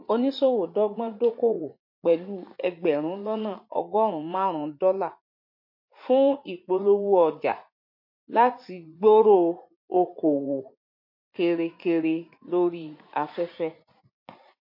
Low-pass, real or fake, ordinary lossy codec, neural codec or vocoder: 5.4 kHz; fake; MP3, 32 kbps; vocoder, 44.1 kHz, 80 mel bands, Vocos